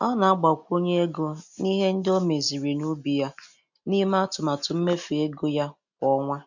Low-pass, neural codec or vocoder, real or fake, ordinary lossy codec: 7.2 kHz; none; real; none